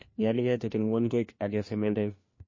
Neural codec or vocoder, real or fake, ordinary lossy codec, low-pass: codec, 16 kHz, 1 kbps, FunCodec, trained on LibriTTS, 50 frames a second; fake; MP3, 32 kbps; 7.2 kHz